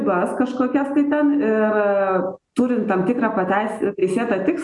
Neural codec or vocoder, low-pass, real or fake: none; 10.8 kHz; real